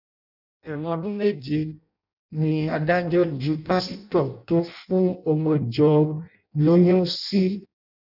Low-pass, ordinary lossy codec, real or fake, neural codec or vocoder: 5.4 kHz; none; fake; codec, 16 kHz in and 24 kHz out, 0.6 kbps, FireRedTTS-2 codec